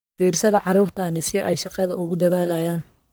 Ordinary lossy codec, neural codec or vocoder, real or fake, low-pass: none; codec, 44.1 kHz, 1.7 kbps, Pupu-Codec; fake; none